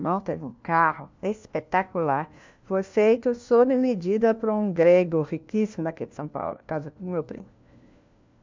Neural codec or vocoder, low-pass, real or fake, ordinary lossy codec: codec, 16 kHz, 1 kbps, FunCodec, trained on LibriTTS, 50 frames a second; 7.2 kHz; fake; MP3, 64 kbps